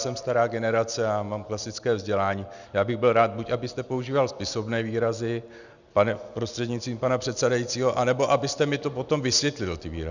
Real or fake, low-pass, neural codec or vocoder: real; 7.2 kHz; none